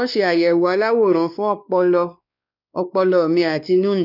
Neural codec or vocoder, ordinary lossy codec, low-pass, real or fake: codec, 16 kHz, 2 kbps, X-Codec, WavLM features, trained on Multilingual LibriSpeech; none; 5.4 kHz; fake